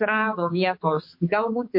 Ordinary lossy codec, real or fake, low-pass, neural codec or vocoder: MP3, 32 kbps; fake; 5.4 kHz; codec, 16 kHz, 4 kbps, X-Codec, HuBERT features, trained on balanced general audio